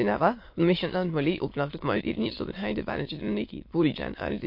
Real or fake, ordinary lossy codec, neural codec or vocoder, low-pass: fake; MP3, 32 kbps; autoencoder, 22.05 kHz, a latent of 192 numbers a frame, VITS, trained on many speakers; 5.4 kHz